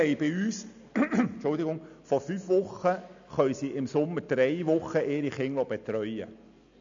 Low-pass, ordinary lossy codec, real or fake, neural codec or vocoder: 7.2 kHz; AAC, 64 kbps; real; none